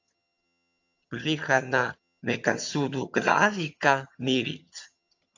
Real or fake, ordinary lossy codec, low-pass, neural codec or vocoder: fake; AAC, 48 kbps; 7.2 kHz; vocoder, 22.05 kHz, 80 mel bands, HiFi-GAN